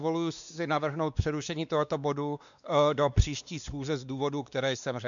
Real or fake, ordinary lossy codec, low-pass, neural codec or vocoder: fake; MP3, 96 kbps; 7.2 kHz; codec, 16 kHz, 2 kbps, X-Codec, WavLM features, trained on Multilingual LibriSpeech